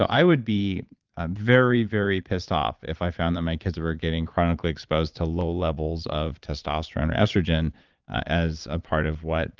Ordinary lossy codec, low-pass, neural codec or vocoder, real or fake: Opus, 24 kbps; 7.2 kHz; vocoder, 22.05 kHz, 80 mel bands, Vocos; fake